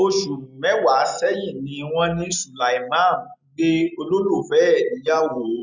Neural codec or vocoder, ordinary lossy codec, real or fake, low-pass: none; none; real; 7.2 kHz